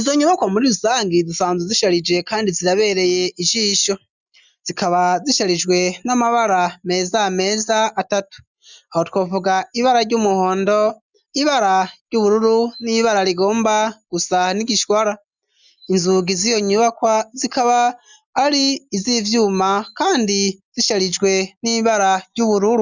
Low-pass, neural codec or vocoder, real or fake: 7.2 kHz; none; real